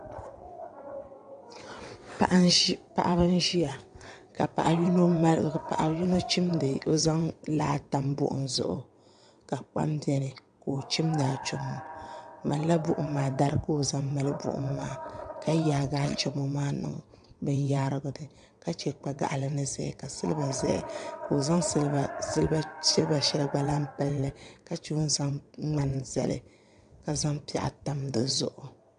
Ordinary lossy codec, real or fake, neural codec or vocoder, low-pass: AAC, 96 kbps; fake; vocoder, 22.05 kHz, 80 mel bands, WaveNeXt; 9.9 kHz